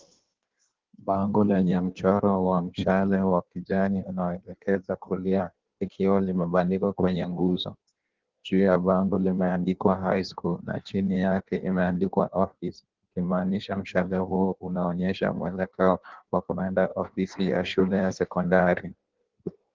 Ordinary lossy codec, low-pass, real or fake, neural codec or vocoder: Opus, 16 kbps; 7.2 kHz; fake; codec, 16 kHz in and 24 kHz out, 1.1 kbps, FireRedTTS-2 codec